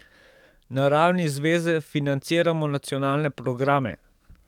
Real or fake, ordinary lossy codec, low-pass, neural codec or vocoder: fake; none; 19.8 kHz; codec, 44.1 kHz, 7.8 kbps, DAC